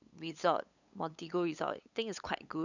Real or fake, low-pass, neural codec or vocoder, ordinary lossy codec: fake; 7.2 kHz; codec, 16 kHz, 8 kbps, FunCodec, trained on LibriTTS, 25 frames a second; none